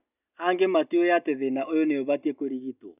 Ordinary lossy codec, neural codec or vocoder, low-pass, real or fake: none; none; 3.6 kHz; real